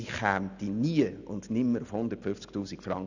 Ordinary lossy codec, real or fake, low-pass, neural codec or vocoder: none; real; 7.2 kHz; none